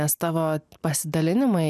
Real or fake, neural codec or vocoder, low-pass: real; none; 14.4 kHz